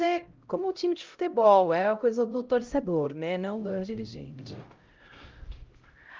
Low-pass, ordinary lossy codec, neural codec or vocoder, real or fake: 7.2 kHz; Opus, 24 kbps; codec, 16 kHz, 0.5 kbps, X-Codec, HuBERT features, trained on LibriSpeech; fake